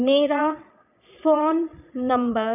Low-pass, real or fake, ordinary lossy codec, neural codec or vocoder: 3.6 kHz; fake; none; vocoder, 22.05 kHz, 80 mel bands, Vocos